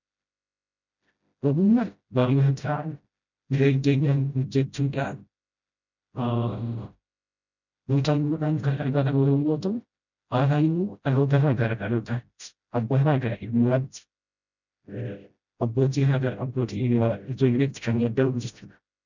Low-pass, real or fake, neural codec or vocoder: 7.2 kHz; fake; codec, 16 kHz, 0.5 kbps, FreqCodec, smaller model